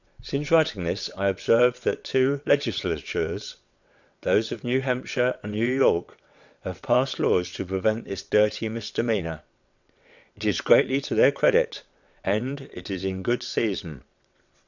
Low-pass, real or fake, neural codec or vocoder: 7.2 kHz; fake; vocoder, 22.05 kHz, 80 mel bands, WaveNeXt